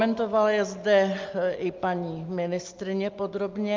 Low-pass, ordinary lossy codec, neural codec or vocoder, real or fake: 7.2 kHz; Opus, 32 kbps; none; real